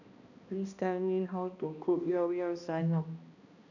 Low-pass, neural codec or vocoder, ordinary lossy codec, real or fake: 7.2 kHz; codec, 16 kHz, 1 kbps, X-Codec, HuBERT features, trained on balanced general audio; none; fake